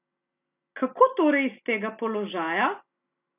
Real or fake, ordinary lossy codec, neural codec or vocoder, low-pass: real; none; none; 3.6 kHz